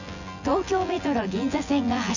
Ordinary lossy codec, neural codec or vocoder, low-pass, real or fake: none; vocoder, 24 kHz, 100 mel bands, Vocos; 7.2 kHz; fake